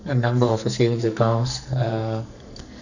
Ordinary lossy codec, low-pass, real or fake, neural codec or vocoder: none; 7.2 kHz; fake; codec, 44.1 kHz, 2.6 kbps, SNAC